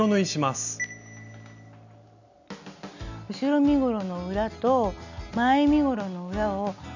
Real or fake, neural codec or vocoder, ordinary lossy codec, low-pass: real; none; none; 7.2 kHz